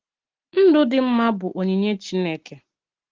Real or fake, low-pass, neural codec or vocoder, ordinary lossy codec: real; 7.2 kHz; none; Opus, 16 kbps